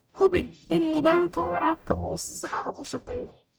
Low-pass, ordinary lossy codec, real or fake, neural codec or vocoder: none; none; fake; codec, 44.1 kHz, 0.9 kbps, DAC